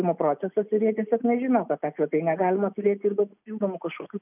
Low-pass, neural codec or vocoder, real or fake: 3.6 kHz; none; real